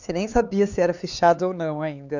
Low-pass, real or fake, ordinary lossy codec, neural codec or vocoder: 7.2 kHz; fake; none; codec, 16 kHz, 4 kbps, X-Codec, HuBERT features, trained on LibriSpeech